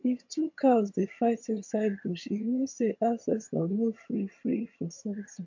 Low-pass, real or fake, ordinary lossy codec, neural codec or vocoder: 7.2 kHz; fake; MP3, 48 kbps; vocoder, 22.05 kHz, 80 mel bands, HiFi-GAN